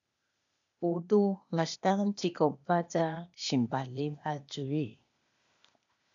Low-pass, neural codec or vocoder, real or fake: 7.2 kHz; codec, 16 kHz, 0.8 kbps, ZipCodec; fake